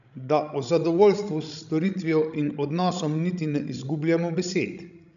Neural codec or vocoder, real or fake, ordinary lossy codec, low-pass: codec, 16 kHz, 16 kbps, FreqCodec, larger model; fake; none; 7.2 kHz